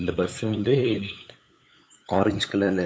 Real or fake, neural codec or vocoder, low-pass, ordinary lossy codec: fake; codec, 16 kHz, 8 kbps, FunCodec, trained on LibriTTS, 25 frames a second; none; none